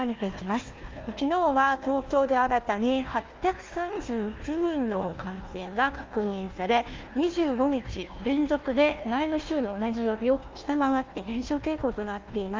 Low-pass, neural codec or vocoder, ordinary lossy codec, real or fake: 7.2 kHz; codec, 16 kHz, 1 kbps, FunCodec, trained on Chinese and English, 50 frames a second; Opus, 24 kbps; fake